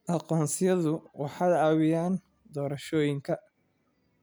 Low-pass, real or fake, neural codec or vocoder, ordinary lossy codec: none; real; none; none